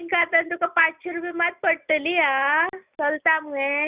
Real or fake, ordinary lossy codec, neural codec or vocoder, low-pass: real; Opus, 64 kbps; none; 3.6 kHz